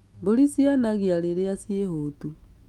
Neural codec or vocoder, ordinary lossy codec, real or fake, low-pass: autoencoder, 48 kHz, 128 numbers a frame, DAC-VAE, trained on Japanese speech; Opus, 32 kbps; fake; 19.8 kHz